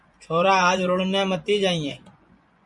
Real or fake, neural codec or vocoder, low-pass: fake; vocoder, 24 kHz, 100 mel bands, Vocos; 10.8 kHz